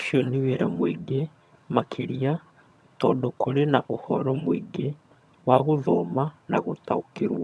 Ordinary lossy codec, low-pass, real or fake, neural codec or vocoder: none; none; fake; vocoder, 22.05 kHz, 80 mel bands, HiFi-GAN